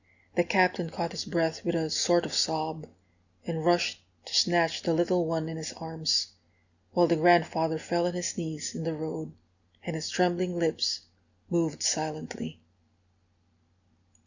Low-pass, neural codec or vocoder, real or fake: 7.2 kHz; none; real